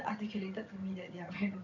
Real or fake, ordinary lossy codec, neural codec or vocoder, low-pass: fake; none; vocoder, 22.05 kHz, 80 mel bands, HiFi-GAN; 7.2 kHz